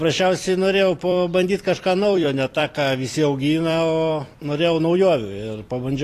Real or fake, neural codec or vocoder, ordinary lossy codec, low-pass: fake; vocoder, 44.1 kHz, 128 mel bands every 256 samples, BigVGAN v2; AAC, 48 kbps; 14.4 kHz